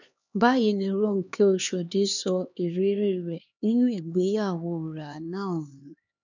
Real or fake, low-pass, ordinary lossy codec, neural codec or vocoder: fake; 7.2 kHz; none; codec, 16 kHz, 2 kbps, X-Codec, HuBERT features, trained on LibriSpeech